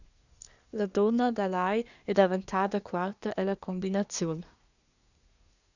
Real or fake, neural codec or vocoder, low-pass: fake; codec, 24 kHz, 1 kbps, SNAC; 7.2 kHz